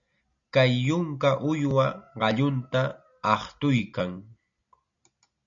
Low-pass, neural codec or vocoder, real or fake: 7.2 kHz; none; real